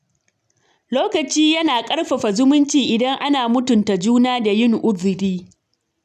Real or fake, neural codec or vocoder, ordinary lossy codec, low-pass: real; none; none; 14.4 kHz